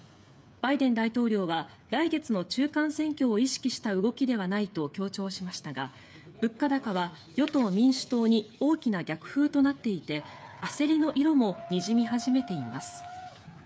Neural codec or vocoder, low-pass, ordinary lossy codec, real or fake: codec, 16 kHz, 8 kbps, FreqCodec, smaller model; none; none; fake